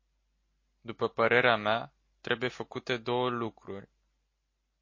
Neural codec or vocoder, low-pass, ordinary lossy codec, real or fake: none; 7.2 kHz; MP3, 32 kbps; real